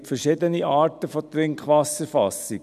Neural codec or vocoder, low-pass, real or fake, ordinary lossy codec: none; 14.4 kHz; real; none